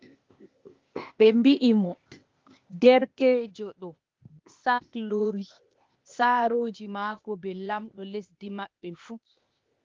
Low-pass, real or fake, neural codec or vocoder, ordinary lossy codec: 7.2 kHz; fake; codec, 16 kHz, 0.8 kbps, ZipCodec; Opus, 24 kbps